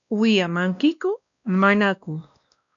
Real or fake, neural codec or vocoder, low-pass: fake; codec, 16 kHz, 1 kbps, X-Codec, WavLM features, trained on Multilingual LibriSpeech; 7.2 kHz